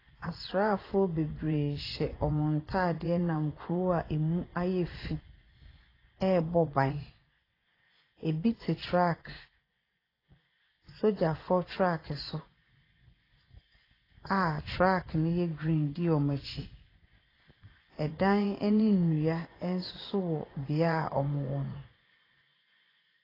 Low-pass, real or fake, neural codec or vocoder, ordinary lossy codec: 5.4 kHz; fake; vocoder, 24 kHz, 100 mel bands, Vocos; AAC, 24 kbps